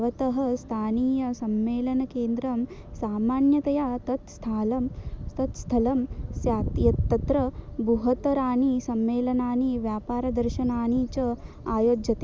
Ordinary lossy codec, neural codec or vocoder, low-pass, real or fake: none; none; none; real